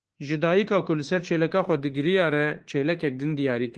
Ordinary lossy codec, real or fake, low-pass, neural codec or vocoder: Opus, 16 kbps; fake; 10.8 kHz; autoencoder, 48 kHz, 32 numbers a frame, DAC-VAE, trained on Japanese speech